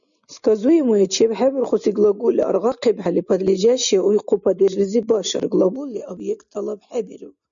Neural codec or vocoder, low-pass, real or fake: none; 7.2 kHz; real